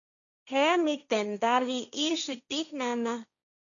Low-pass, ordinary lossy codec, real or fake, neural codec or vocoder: 7.2 kHz; MP3, 96 kbps; fake; codec, 16 kHz, 1.1 kbps, Voila-Tokenizer